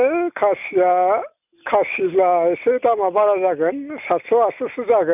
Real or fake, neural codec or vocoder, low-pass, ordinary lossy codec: real; none; 3.6 kHz; none